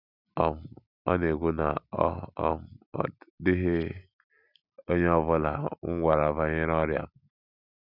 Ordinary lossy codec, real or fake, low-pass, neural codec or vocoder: none; real; 5.4 kHz; none